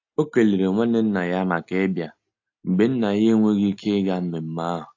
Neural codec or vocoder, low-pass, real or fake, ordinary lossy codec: none; 7.2 kHz; real; AAC, 48 kbps